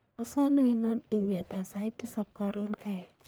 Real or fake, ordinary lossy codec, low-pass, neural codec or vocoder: fake; none; none; codec, 44.1 kHz, 1.7 kbps, Pupu-Codec